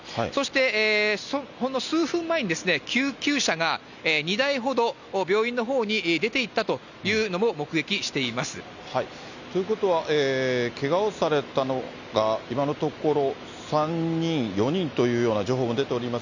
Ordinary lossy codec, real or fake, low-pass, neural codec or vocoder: none; real; 7.2 kHz; none